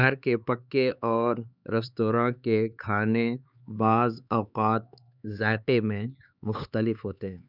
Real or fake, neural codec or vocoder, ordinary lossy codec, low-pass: fake; codec, 16 kHz, 4 kbps, X-Codec, HuBERT features, trained on LibriSpeech; none; 5.4 kHz